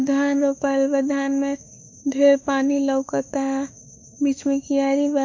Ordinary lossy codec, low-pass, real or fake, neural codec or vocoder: MP3, 48 kbps; 7.2 kHz; fake; autoencoder, 48 kHz, 32 numbers a frame, DAC-VAE, trained on Japanese speech